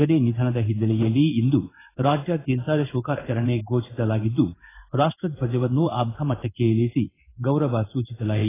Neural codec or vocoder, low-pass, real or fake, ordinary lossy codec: codec, 16 kHz in and 24 kHz out, 1 kbps, XY-Tokenizer; 3.6 kHz; fake; AAC, 16 kbps